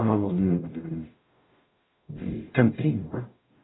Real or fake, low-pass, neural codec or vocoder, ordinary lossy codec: fake; 7.2 kHz; codec, 44.1 kHz, 0.9 kbps, DAC; AAC, 16 kbps